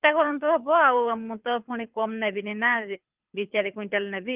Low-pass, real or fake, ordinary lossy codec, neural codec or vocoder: 3.6 kHz; fake; Opus, 32 kbps; codec, 24 kHz, 6 kbps, HILCodec